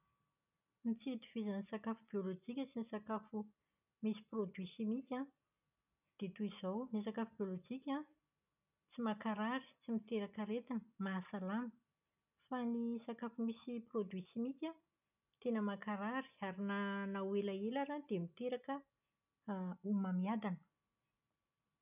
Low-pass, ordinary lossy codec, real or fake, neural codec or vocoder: 3.6 kHz; none; real; none